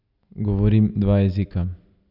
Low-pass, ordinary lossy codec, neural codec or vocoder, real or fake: 5.4 kHz; none; none; real